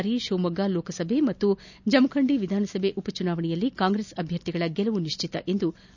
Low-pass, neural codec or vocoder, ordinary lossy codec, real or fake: 7.2 kHz; none; none; real